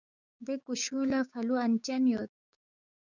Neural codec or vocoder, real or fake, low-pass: codec, 16 kHz, 6 kbps, DAC; fake; 7.2 kHz